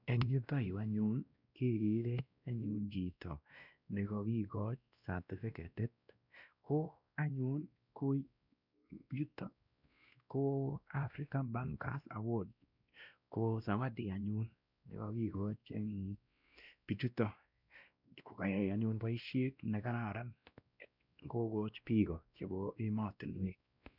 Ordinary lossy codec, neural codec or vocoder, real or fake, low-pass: none; codec, 16 kHz, 1 kbps, X-Codec, WavLM features, trained on Multilingual LibriSpeech; fake; 5.4 kHz